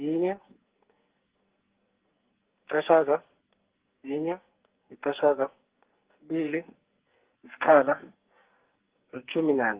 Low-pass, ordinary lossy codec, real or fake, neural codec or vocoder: 3.6 kHz; Opus, 16 kbps; fake; codec, 44.1 kHz, 2.6 kbps, SNAC